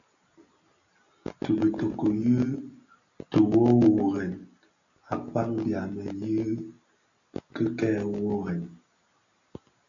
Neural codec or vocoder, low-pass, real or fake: none; 7.2 kHz; real